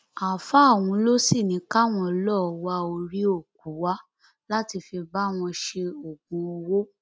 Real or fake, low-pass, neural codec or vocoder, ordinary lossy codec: real; none; none; none